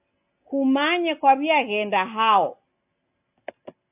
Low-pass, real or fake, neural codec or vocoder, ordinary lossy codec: 3.6 kHz; real; none; AAC, 32 kbps